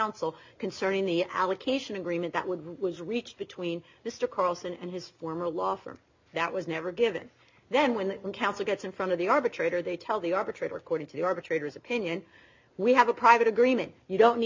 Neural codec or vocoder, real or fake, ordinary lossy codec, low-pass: none; real; MP3, 64 kbps; 7.2 kHz